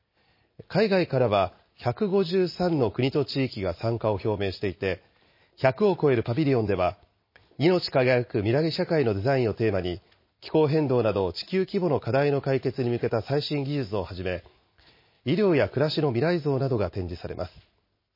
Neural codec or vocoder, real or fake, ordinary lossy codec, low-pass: none; real; MP3, 24 kbps; 5.4 kHz